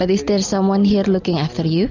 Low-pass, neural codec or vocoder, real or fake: 7.2 kHz; none; real